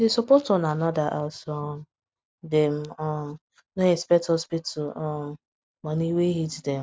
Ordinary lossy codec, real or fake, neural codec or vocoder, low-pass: none; real; none; none